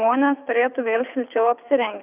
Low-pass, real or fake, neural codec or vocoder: 3.6 kHz; fake; codec, 24 kHz, 6 kbps, HILCodec